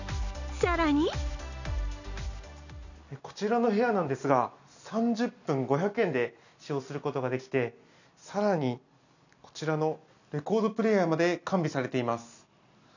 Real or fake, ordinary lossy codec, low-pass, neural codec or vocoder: real; none; 7.2 kHz; none